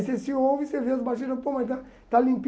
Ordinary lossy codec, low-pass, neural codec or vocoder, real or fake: none; none; none; real